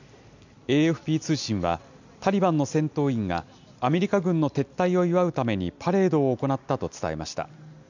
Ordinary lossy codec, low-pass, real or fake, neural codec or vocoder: none; 7.2 kHz; real; none